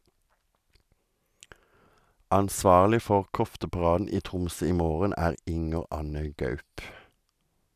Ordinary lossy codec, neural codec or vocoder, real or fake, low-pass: none; none; real; 14.4 kHz